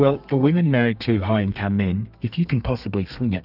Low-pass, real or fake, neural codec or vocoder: 5.4 kHz; fake; codec, 32 kHz, 1.9 kbps, SNAC